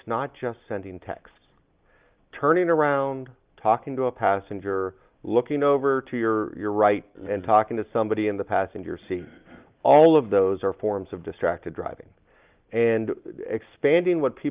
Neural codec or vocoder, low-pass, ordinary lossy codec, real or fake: none; 3.6 kHz; Opus, 32 kbps; real